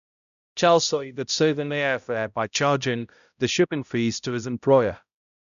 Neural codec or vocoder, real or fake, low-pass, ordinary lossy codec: codec, 16 kHz, 0.5 kbps, X-Codec, HuBERT features, trained on balanced general audio; fake; 7.2 kHz; none